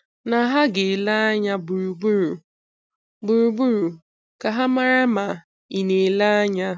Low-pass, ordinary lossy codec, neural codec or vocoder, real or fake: none; none; none; real